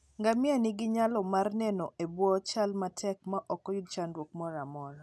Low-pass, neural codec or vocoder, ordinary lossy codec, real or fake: none; none; none; real